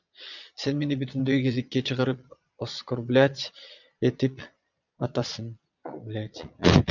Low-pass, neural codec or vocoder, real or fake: 7.2 kHz; vocoder, 22.05 kHz, 80 mel bands, Vocos; fake